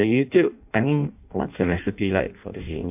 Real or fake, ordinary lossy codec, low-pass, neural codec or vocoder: fake; AAC, 32 kbps; 3.6 kHz; codec, 16 kHz in and 24 kHz out, 0.6 kbps, FireRedTTS-2 codec